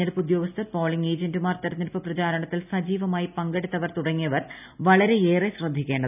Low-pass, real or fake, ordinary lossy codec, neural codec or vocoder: 3.6 kHz; real; none; none